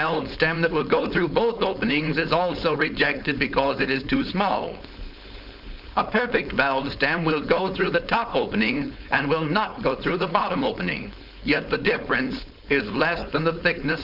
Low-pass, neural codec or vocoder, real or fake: 5.4 kHz; codec, 16 kHz, 4.8 kbps, FACodec; fake